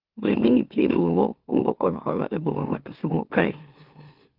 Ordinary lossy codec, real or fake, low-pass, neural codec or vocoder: Opus, 32 kbps; fake; 5.4 kHz; autoencoder, 44.1 kHz, a latent of 192 numbers a frame, MeloTTS